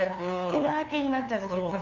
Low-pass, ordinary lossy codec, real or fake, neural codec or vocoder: 7.2 kHz; none; fake; codec, 16 kHz, 2 kbps, FunCodec, trained on LibriTTS, 25 frames a second